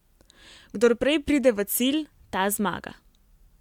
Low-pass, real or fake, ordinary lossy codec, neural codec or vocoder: 19.8 kHz; real; MP3, 96 kbps; none